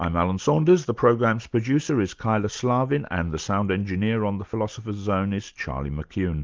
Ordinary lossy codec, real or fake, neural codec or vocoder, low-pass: Opus, 32 kbps; real; none; 7.2 kHz